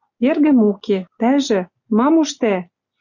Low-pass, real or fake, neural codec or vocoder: 7.2 kHz; real; none